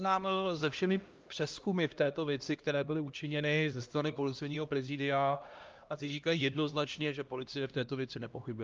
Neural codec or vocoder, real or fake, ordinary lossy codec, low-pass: codec, 16 kHz, 1 kbps, X-Codec, HuBERT features, trained on LibriSpeech; fake; Opus, 24 kbps; 7.2 kHz